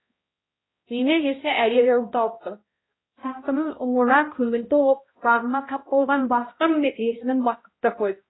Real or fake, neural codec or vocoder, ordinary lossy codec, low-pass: fake; codec, 16 kHz, 0.5 kbps, X-Codec, HuBERT features, trained on balanced general audio; AAC, 16 kbps; 7.2 kHz